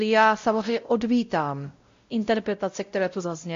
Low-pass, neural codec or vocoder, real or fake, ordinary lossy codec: 7.2 kHz; codec, 16 kHz, 0.5 kbps, X-Codec, WavLM features, trained on Multilingual LibriSpeech; fake; MP3, 48 kbps